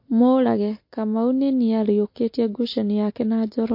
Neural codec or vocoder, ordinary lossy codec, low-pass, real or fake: none; MP3, 32 kbps; 5.4 kHz; real